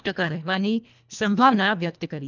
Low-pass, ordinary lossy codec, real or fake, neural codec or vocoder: 7.2 kHz; none; fake; codec, 24 kHz, 1.5 kbps, HILCodec